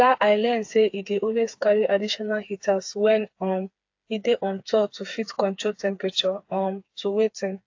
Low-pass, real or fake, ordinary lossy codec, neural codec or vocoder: 7.2 kHz; fake; AAC, 48 kbps; codec, 16 kHz, 4 kbps, FreqCodec, smaller model